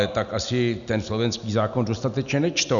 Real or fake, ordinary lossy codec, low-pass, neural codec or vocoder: real; MP3, 96 kbps; 7.2 kHz; none